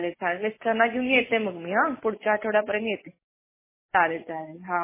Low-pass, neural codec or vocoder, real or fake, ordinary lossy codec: 3.6 kHz; none; real; MP3, 16 kbps